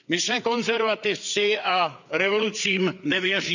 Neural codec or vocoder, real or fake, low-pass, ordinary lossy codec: codec, 16 kHz, 4 kbps, FreqCodec, larger model; fake; 7.2 kHz; none